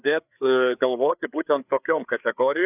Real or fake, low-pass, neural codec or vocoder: fake; 3.6 kHz; codec, 16 kHz, 4 kbps, FreqCodec, larger model